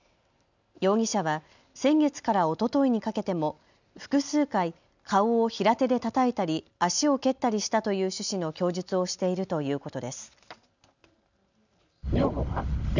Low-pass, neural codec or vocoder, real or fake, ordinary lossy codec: 7.2 kHz; none; real; none